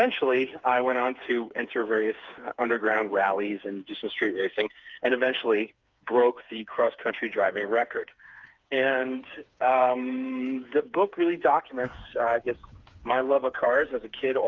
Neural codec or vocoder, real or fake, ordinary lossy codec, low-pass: codec, 16 kHz, 4 kbps, FreqCodec, smaller model; fake; Opus, 32 kbps; 7.2 kHz